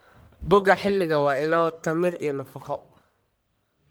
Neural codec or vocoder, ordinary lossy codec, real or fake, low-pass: codec, 44.1 kHz, 1.7 kbps, Pupu-Codec; none; fake; none